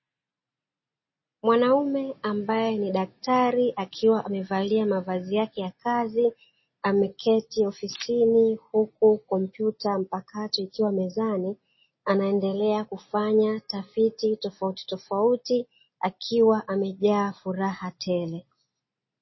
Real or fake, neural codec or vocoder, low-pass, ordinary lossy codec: real; none; 7.2 kHz; MP3, 24 kbps